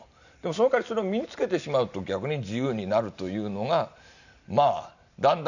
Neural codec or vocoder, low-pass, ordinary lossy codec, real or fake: none; 7.2 kHz; none; real